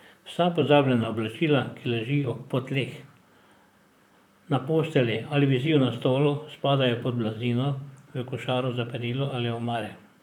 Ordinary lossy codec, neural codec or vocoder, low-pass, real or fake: none; vocoder, 44.1 kHz, 128 mel bands, Pupu-Vocoder; 19.8 kHz; fake